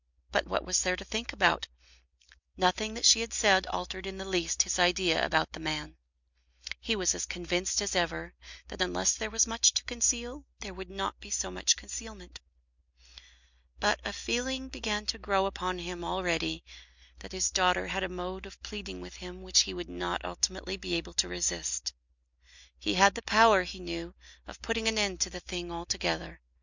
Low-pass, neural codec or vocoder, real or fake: 7.2 kHz; none; real